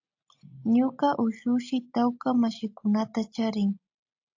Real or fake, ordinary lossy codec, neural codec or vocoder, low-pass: real; AAC, 48 kbps; none; 7.2 kHz